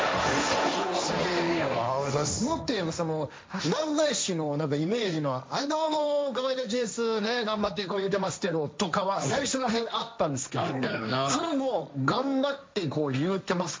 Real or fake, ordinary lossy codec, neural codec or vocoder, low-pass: fake; none; codec, 16 kHz, 1.1 kbps, Voila-Tokenizer; none